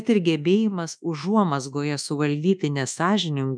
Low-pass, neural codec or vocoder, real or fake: 9.9 kHz; codec, 24 kHz, 1.2 kbps, DualCodec; fake